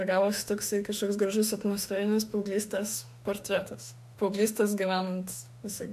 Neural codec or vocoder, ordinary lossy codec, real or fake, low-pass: autoencoder, 48 kHz, 32 numbers a frame, DAC-VAE, trained on Japanese speech; MP3, 96 kbps; fake; 14.4 kHz